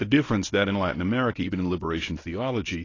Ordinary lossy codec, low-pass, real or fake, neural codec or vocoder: AAC, 32 kbps; 7.2 kHz; fake; codec, 24 kHz, 0.9 kbps, WavTokenizer, medium speech release version 1